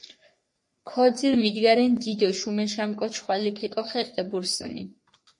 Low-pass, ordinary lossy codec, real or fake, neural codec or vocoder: 10.8 kHz; MP3, 48 kbps; fake; codec, 44.1 kHz, 3.4 kbps, Pupu-Codec